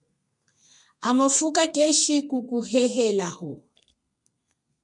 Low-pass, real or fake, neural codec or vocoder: 10.8 kHz; fake; codec, 32 kHz, 1.9 kbps, SNAC